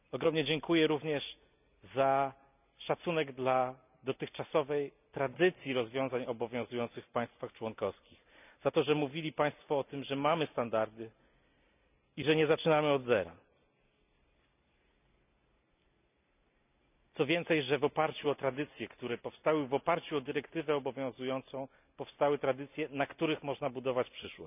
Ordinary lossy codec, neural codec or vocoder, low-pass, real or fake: none; none; 3.6 kHz; real